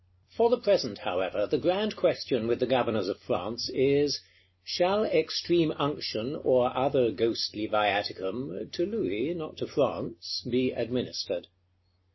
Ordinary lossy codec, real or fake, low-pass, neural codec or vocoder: MP3, 24 kbps; real; 7.2 kHz; none